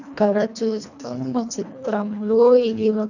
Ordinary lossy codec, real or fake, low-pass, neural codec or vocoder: none; fake; 7.2 kHz; codec, 24 kHz, 1.5 kbps, HILCodec